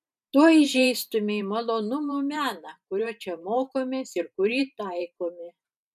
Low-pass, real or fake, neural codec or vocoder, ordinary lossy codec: 14.4 kHz; fake; vocoder, 44.1 kHz, 128 mel bands every 512 samples, BigVGAN v2; MP3, 96 kbps